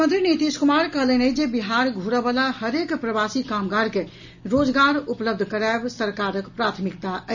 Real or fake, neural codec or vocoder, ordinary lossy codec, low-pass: real; none; none; 7.2 kHz